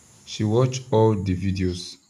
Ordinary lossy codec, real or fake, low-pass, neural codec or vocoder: none; real; 14.4 kHz; none